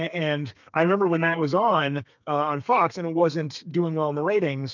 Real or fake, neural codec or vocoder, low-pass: fake; codec, 32 kHz, 1.9 kbps, SNAC; 7.2 kHz